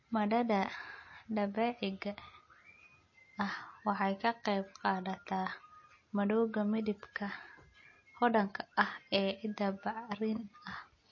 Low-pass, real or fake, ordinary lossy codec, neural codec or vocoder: 7.2 kHz; real; MP3, 32 kbps; none